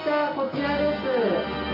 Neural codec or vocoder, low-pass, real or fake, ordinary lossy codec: none; 5.4 kHz; real; none